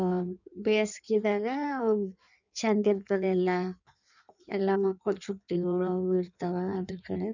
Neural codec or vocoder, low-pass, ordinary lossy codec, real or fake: codec, 16 kHz in and 24 kHz out, 1.1 kbps, FireRedTTS-2 codec; 7.2 kHz; none; fake